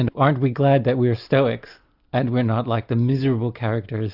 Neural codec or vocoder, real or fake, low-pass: none; real; 5.4 kHz